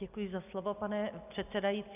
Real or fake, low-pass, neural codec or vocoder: real; 3.6 kHz; none